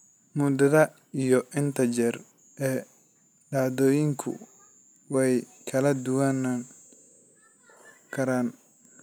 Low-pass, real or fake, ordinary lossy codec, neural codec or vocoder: none; real; none; none